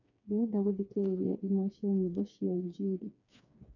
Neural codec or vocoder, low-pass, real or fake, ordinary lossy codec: codec, 16 kHz, 4 kbps, FreqCodec, smaller model; 7.2 kHz; fake; none